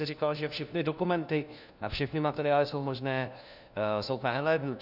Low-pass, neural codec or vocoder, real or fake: 5.4 kHz; codec, 16 kHz, 1 kbps, FunCodec, trained on LibriTTS, 50 frames a second; fake